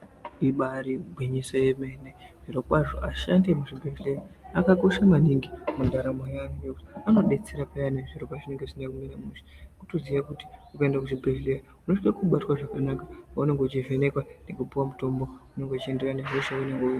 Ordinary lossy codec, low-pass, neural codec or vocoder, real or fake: Opus, 32 kbps; 14.4 kHz; none; real